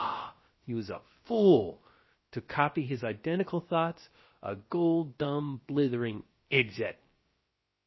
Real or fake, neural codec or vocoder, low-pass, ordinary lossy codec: fake; codec, 16 kHz, about 1 kbps, DyCAST, with the encoder's durations; 7.2 kHz; MP3, 24 kbps